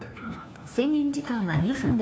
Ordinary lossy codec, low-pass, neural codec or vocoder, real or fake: none; none; codec, 16 kHz, 1 kbps, FunCodec, trained on LibriTTS, 50 frames a second; fake